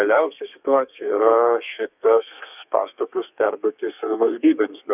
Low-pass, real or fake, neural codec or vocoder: 3.6 kHz; fake; codec, 32 kHz, 1.9 kbps, SNAC